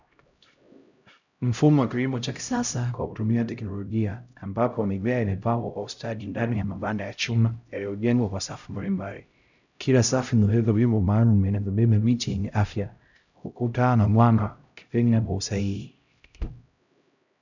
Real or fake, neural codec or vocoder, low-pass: fake; codec, 16 kHz, 0.5 kbps, X-Codec, HuBERT features, trained on LibriSpeech; 7.2 kHz